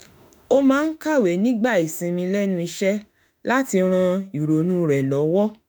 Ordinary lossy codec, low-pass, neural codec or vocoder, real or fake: none; none; autoencoder, 48 kHz, 32 numbers a frame, DAC-VAE, trained on Japanese speech; fake